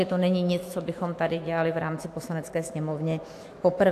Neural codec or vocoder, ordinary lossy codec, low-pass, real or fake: autoencoder, 48 kHz, 128 numbers a frame, DAC-VAE, trained on Japanese speech; AAC, 64 kbps; 14.4 kHz; fake